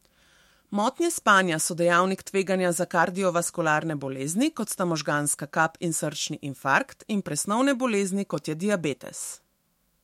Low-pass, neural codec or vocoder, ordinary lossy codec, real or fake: 19.8 kHz; autoencoder, 48 kHz, 128 numbers a frame, DAC-VAE, trained on Japanese speech; MP3, 64 kbps; fake